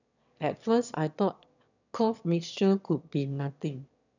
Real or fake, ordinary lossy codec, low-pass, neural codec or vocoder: fake; none; 7.2 kHz; autoencoder, 22.05 kHz, a latent of 192 numbers a frame, VITS, trained on one speaker